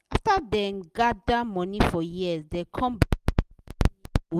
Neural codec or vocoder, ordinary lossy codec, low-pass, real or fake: none; Opus, 32 kbps; 14.4 kHz; real